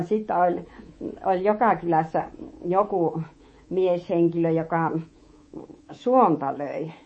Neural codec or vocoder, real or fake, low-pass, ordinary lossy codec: codec, 24 kHz, 3.1 kbps, DualCodec; fake; 9.9 kHz; MP3, 32 kbps